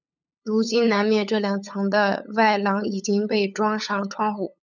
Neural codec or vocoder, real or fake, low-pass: codec, 16 kHz, 8 kbps, FunCodec, trained on LibriTTS, 25 frames a second; fake; 7.2 kHz